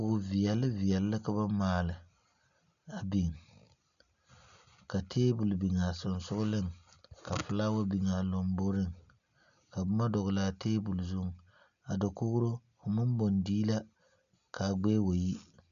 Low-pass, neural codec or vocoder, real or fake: 7.2 kHz; none; real